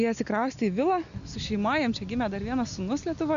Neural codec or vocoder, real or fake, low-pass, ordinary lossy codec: none; real; 7.2 kHz; AAC, 96 kbps